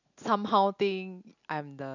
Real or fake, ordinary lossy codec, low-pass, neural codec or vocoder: real; none; 7.2 kHz; none